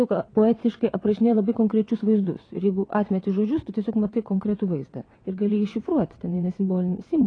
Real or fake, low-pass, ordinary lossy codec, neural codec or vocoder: fake; 9.9 kHz; AAC, 32 kbps; vocoder, 22.05 kHz, 80 mel bands, WaveNeXt